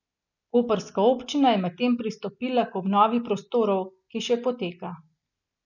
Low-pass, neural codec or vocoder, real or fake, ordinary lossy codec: 7.2 kHz; none; real; none